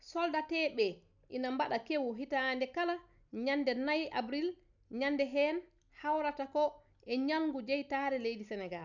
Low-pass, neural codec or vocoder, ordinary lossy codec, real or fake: 7.2 kHz; none; none; real